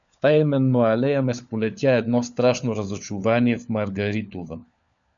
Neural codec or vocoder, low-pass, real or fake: codec, 16 kHz, 4 kbps, FunCodec, trained on LibriTTS, 50 frames a second; 7.2 kHz; fake